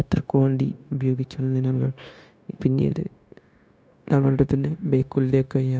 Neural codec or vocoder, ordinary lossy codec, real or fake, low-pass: codec, 16 kHz, 0.9 kbps, LongCat-Audio-Codec; none; fake; none